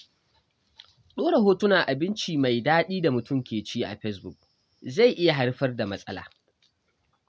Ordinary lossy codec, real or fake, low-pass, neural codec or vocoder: none; real; none; none